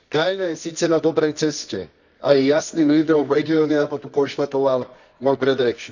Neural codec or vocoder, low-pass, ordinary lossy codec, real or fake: codec, 24 kHz, 0.9 kbps, WavTokenizer, medium music audio release; 7.2 kHz; none; fake